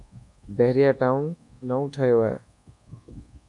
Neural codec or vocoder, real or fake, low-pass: codec, 24 kHz, 1.2 kbps, DualCodec; fake; 10.8 kHz